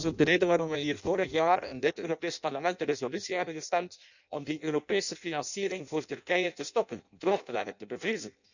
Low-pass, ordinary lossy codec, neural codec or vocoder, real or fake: 7.2 kHz; none; codec, 16 kHz in and 24 kHz out, 0.6 kbps, FireRedTTS-2 codec; fake